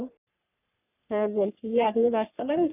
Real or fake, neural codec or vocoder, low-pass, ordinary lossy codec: fake; codec, 44.1 kHz, 3.4 kbps, Pupu-Codec; 3.6 kHz; none